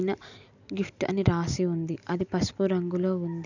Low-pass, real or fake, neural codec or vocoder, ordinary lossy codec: 7.2 kHz; real; none; none